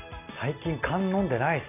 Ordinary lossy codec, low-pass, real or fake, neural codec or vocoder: none; 3.6 kHz; real; none